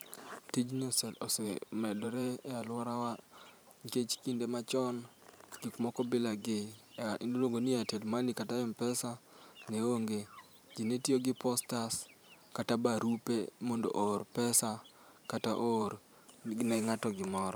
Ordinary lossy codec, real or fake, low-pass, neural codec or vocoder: none; fake; none; vocoder, 44.1 kHz, 128 mel bands every 512 samples, BigVGAN v2